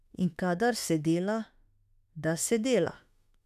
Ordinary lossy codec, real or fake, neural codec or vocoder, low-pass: none; fake; autoencoder, 48 kHz, 32 numbers a frame, DAC-VAE, trained on Japanese speech; 14.4 kHz